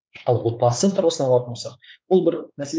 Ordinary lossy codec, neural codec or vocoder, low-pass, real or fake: none; codec, 16 kHz, 4 kbps, X-Codec, WavLM features, trained on Multilingual LibriSpeech; none; fake